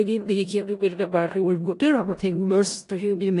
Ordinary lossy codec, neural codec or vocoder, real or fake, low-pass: AAC, 48 kbps; codec, 16 kHz in and 24 kHz out, 0.4 kbps, LongCat-Audio-Codec, four codebook decoder; fake; 10.8 kHz